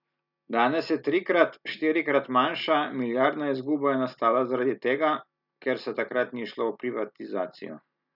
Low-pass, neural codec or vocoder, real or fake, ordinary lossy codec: 5.4 kHz; none; real; none